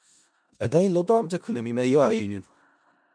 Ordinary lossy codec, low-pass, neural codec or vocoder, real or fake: MP3, 64 kbps; 9.9 kHz; codec, 16 kHz in and 24 kHz out, 0.4 kbps, LongCat-Audio-Codec, four codebook decoder; fake